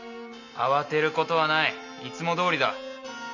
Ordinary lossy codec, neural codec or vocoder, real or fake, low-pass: none; none; real; 7.2 kHz